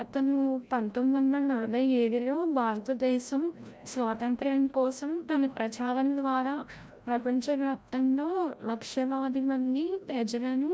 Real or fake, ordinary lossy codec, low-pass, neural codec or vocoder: fake; none; none; codec, 16 kHz, 0.5 kbps, FreqCodec, larger model